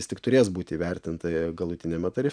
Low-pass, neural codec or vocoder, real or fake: 9.9 kHz; none; real